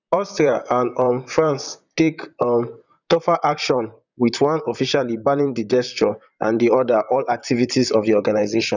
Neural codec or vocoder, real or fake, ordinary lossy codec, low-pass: vocoder, 44.1 kHz, 128 mel bands, Pupu-Vocoder; fake; none; 7.2 kHz